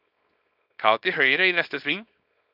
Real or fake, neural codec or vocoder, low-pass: fake; codec, 24 kHz, 0.9 kbps, WavTokenizer, small release; 5.4 kHz